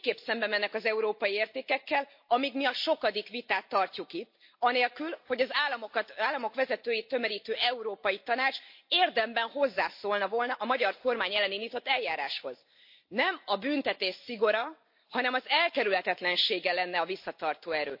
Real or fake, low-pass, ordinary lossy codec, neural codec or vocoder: real; 5.4 kHz; MP3, 32 kbps; none